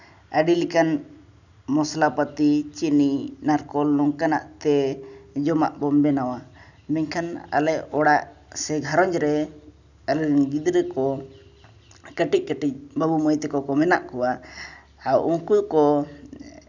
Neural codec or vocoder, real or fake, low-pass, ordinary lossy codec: none; real; 7.2 kHz; none